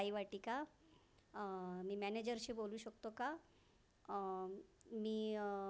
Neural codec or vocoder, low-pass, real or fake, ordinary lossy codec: none; none; real; none